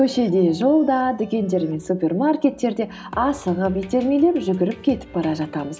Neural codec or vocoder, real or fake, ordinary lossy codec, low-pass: none; real; none; none